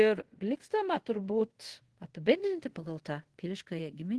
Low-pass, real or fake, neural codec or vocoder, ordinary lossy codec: 10.8 kHz; fake; codec, 24 kHz, 0.5 kbps, DualCodec; Opus, 16 kbps